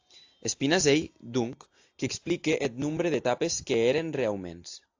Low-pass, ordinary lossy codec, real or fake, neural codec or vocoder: 7.2 kHz; AAC, 48 kbps; real; none